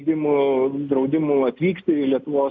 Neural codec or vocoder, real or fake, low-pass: none; real; 7.2 kHz